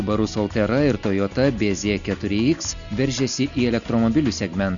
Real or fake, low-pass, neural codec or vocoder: real; 7.2 kHz; none